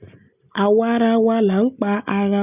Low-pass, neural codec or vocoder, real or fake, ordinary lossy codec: 3.6 kHz; none; real; none